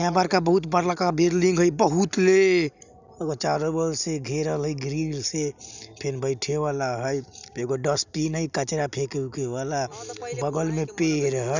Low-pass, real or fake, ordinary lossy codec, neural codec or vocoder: 7.2 kHz; real; none; none